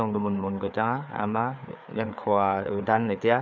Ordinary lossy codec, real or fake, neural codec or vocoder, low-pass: none; fake; codec, 16 kHz, 4 kbps, FreqCodec, larger model; 7.2 kHz